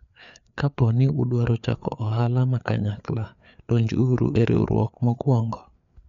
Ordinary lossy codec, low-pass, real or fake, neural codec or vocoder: none; 7.2 kHz; fake; codec, 16 kHz, 4 kbps, FreqCodec, larger model